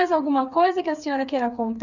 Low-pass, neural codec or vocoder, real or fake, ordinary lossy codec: 7.2 kHz; codec, 16 kHz, 4 kbps, FreqCodec, smaller model; fake; none